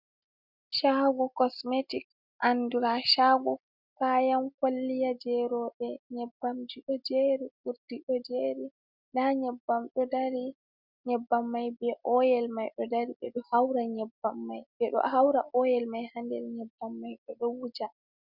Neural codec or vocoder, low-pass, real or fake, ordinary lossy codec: none; 5.4 kHz; real; Opus, 64 kbps